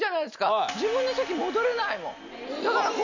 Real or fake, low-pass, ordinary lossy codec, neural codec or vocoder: real; 7.2 kHz; none; none